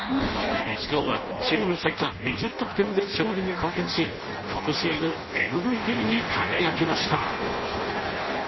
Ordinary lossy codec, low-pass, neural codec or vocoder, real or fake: MP3, 24 kbps; 7.2 kHz; codec, 16 kHz in and 24 kHz out, 0.6 kbps, FireRedTTS-2 codec; fake